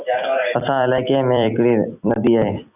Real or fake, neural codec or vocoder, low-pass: fake; vocoder, 44.1 kHz, 128 mel bands every 256 samples, BigVGAN v2; 3.6 kHz